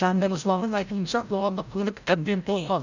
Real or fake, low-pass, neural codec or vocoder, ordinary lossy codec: fake; 7.2 kHz; codec, 16 kHz, 0.5 kbps, FreqCodec, larger model; none